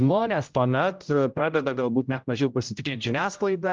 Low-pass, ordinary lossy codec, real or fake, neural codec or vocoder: 7.2 kHz; Opus, 24 kbps; fake; codec, 16 kHz, 0.5 kbps, X-Codec, HuBERT features, trained on general audio